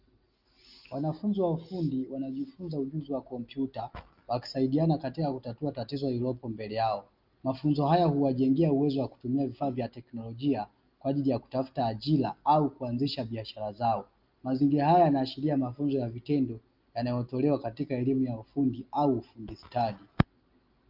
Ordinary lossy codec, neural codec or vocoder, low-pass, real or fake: Opus, 24 kbps; none; 5.4 kHz; real